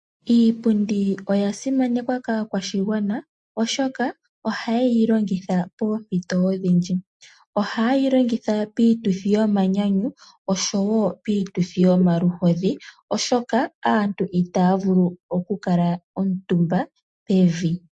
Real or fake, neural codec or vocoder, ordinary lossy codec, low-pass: real; none; MP3, 48 kbps; 10.8 kHz